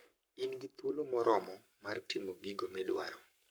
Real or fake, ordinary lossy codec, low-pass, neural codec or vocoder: fake; none; none; codec, 44.1 kHz, 7.8 kbps, Pupu-Codec